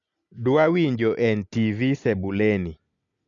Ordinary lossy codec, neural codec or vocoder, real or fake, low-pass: none; none; real; 7.2 kHz